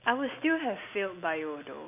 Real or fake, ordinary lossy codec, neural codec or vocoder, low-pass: real; none; none; 3.6 kHz